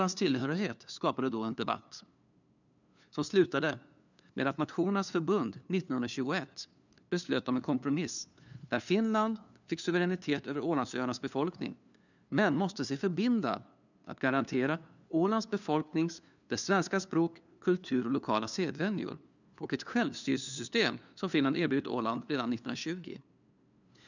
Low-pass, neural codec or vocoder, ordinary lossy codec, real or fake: 7.2 kHz; codec, 16 kHz, 2 kbps, FunCodec, trained on LibriTTS, 25 frames a second; none; fake